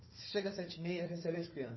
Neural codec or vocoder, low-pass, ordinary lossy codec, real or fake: codec, 16 kHz, 4 kbps, FunCodec, trained on Chinese and English, 50 frames a second; 7.2 kHz; MP3, 24 kbps; fake